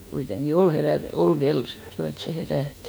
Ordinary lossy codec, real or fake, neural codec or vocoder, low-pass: none; fake; autoencoder, 48 kHz, 32 numbers a frame, DAC-VAE, trained on Japanese speech; none